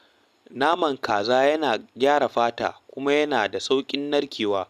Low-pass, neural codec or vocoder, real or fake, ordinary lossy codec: 14.4 kHz; none; real; none